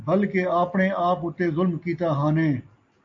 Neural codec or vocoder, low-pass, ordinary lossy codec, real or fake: none; 7.2 kHz; AAC, 48 kbps; real